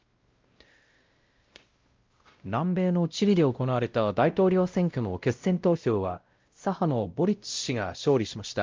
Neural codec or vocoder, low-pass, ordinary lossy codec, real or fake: codec, 16 kHz, 0.5 kbps, X-Codec, WavLM features, trained on Multilingual LibriSpeech; 7.2 kHz; Opus, 24 kbps; fake